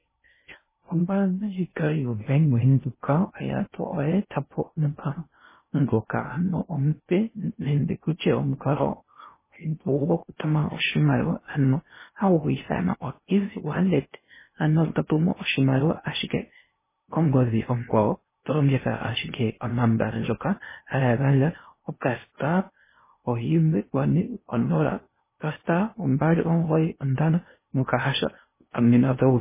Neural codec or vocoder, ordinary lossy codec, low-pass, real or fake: codec, 16 kHz in and 24 kHz out, 0.6 kbps, FocalCodec, streaming, 4096 codes; MP3, 16 kbps; 3.6 kHz; fake